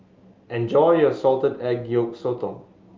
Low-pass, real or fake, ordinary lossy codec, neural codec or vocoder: 7.2 kHz; real; Opus, 24 kbps; none